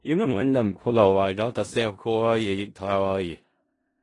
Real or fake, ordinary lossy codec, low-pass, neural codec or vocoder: fake; AAC, 32 kbps; 10.8 kHz; codec, 16 kHz in and 24 kHz out, 0.4 kbps, LongCat-Audio-Codec, four codebook decoder